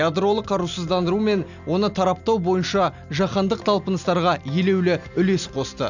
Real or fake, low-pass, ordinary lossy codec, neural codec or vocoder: real; 7.2 kHz; none; none